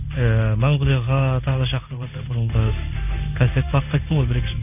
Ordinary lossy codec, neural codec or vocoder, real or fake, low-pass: none; codec, 16 kHz in and 24 kHz out, 1 kbps, XY-Tokenizer; fake; 3.6 kHz